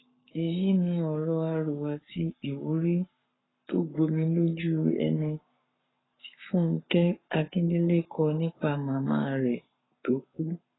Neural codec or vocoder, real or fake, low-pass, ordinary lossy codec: codec, 44.1 kHz, 7.8 kbps, Pupu-Codec; fake; 7.2 kHz; AAC, 16 kbps